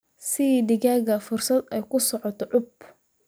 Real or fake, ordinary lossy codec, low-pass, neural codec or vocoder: real; none; none; none